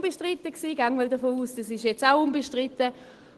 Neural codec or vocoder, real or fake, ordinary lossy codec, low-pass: none; real; Opus, 16 kbps; 10.8 kHz